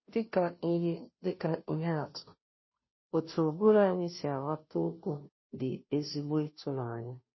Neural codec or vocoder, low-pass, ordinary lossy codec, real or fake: codec, 16 kHz, 0.5 kbps, FunCodec, trained on Chinese and English, 25 frames a second; 7.2 kHz; MP3, 24 kbps; fake